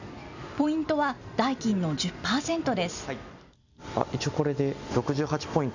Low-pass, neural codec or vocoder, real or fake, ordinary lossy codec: 7.2 kHz; none; real; none